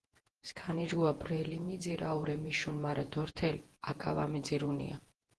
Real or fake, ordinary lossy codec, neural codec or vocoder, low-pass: fake; Opus, 16 kbps; vocoder, 48 kHz, 128 mel bands, Vocos; 10.8 kHz